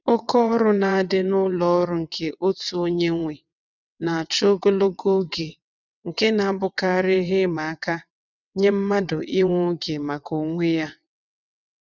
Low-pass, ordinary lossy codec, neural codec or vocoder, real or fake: 7.2 kHz; none; vocoder, 22.05 kHz, 80 mel bands, WaveNeXt; fake